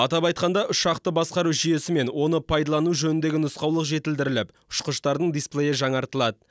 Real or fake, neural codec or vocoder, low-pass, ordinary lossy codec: real; none; none; none